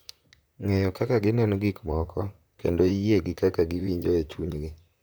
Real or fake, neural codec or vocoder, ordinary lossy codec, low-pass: fake; vocoder, 44.1 kHz, 128 mel bands, Pupu-Vocoder; none; none